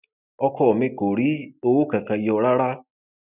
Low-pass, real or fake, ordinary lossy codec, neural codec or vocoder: 3.6 kHz; real; none; none